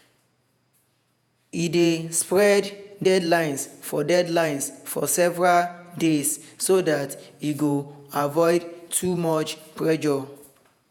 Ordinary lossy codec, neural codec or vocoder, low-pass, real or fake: none; vocoder, 48 kHz, 128 mel bands, Vocos; none; fake